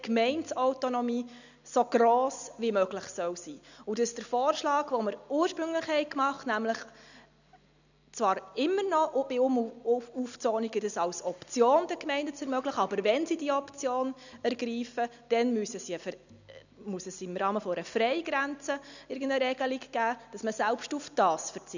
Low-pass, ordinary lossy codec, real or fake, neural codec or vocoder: 7.2 kHz; none; real; none